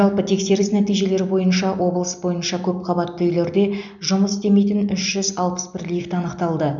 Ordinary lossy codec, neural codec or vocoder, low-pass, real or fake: none; none; 7.2 kHz; real